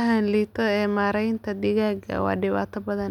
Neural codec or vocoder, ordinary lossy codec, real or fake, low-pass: none; none; real; 19.8 kHz